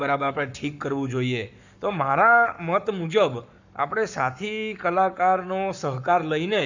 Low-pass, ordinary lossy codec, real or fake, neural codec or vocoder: 7.2 kHz; none; fake; codec, 44.1 kHz, 7.8 kbps, Pupu-Codec